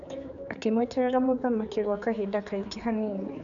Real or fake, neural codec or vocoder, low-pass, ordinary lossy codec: fake; codec, 16 kHz, 4 kbps, X-Codec, HuBERT features, trained on general audio; 7.2 kHz; none